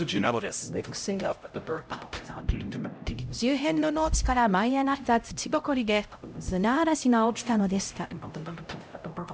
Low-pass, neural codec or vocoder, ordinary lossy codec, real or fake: none; codec, 16 kHz, 0.5 kbps, X-Codec, HuBERT features, trained on LibriSpeech; none; fake